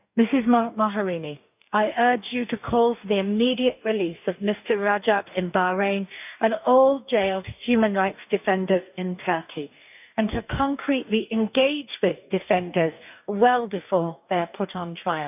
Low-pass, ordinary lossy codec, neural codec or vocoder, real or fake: 3.6 kHz; none; codec, 44.1 kHz, 2.6 kbps, DAC; fake